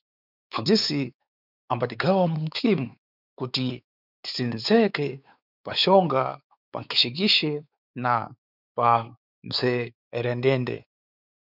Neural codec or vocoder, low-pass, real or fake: codec, 16 kHz, 4 kbps, X-Codec, WavLM features, trained on Multilingual LibriSpeech; 5.4 kHz; fake